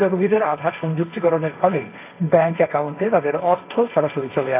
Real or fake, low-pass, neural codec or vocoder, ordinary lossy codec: fake; 3.6 kHz; codec, 16 kHz, 1.1 kbps, Voila-Tokenizer; AAC, 24 kbps